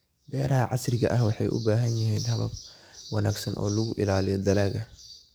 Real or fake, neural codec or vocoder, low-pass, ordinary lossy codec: fake; codec, 44.1 kHz, 7.8 kbps, DAC; none; none